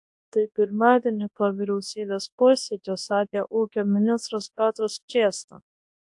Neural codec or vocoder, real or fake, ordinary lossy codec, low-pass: codec, 24 kHz, 0.9 kbps, WavTokenizer, large speech release; fake; AAC, 64 kbps; 10.8 kHz